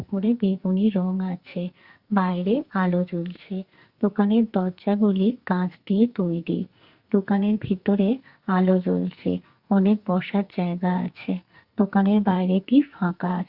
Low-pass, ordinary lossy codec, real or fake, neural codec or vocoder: 5.4 kHz; Opus, 64 kbps; fake; codec, 44.1 kHz, 2.6 kbps, SNAC